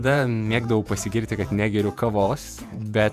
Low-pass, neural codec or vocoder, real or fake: 14.4 kHz; vocoder, 48 kHz, 128 mel bands, Vocos; fake